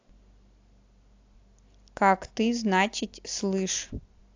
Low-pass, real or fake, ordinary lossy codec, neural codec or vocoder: 7.2 kHz; real; AAC, 48 kbps; none